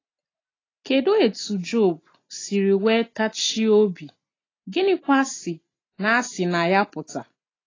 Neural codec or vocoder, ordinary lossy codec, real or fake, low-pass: none; AAC, 32 kbps; real; 7.2 kHz